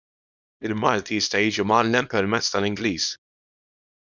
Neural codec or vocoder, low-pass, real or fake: codec, 24 kHz, 0.9 kbps, WavTokenizer, small release; 7.2 kHz; fake